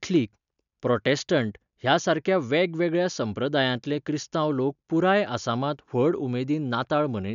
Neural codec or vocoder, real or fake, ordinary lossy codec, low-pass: none; real; none; 7.2 kHz